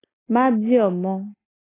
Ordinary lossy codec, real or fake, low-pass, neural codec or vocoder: AAC, 16 kbps; real; 3.6 kHz; none